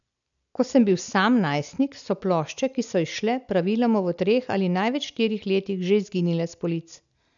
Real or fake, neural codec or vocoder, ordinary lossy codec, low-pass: real; none; none; 7.2 kHz